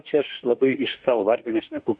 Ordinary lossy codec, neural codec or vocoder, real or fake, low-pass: Opus, 64 kbps; autoencoder, 48 kHz, 32 numbers a frame, DAC-VAE, trained on Japanese speech; fake; 9.9 kHz